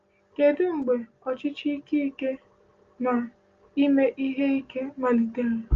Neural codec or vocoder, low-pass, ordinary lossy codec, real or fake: none; 7.2 kHz; Opus, 32 kbps; real